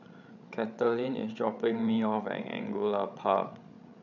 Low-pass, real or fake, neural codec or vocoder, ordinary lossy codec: 7.2 kHz; fake; codec, 16 kHz, 16 kbps, FreqCodec, larger model; none